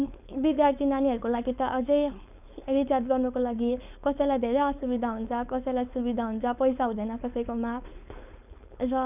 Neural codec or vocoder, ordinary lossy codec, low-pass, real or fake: codec, 16 kHz, 4.8 kbps, FACodec; none; 3.6 kHz; fake